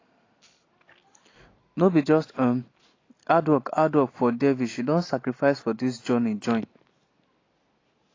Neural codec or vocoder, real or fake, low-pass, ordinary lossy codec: none; real; 7.2 kHz; AAC, 32 kbps